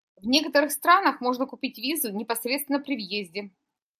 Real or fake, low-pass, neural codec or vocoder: real; 14.4 kHz; none